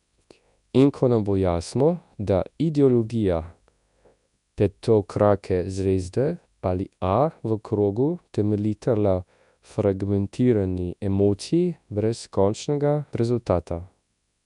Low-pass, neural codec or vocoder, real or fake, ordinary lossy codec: 10.8 kHz; codec, 24 kHz, 0.9 kbps, WavTokenizer, large speech release; fake; none